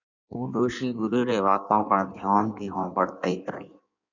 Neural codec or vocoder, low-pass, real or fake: codec, 16 kHz in and 24 kHz out, 1.1 kbps, FireRedTTS-2 codec; 7.2 kHz; fake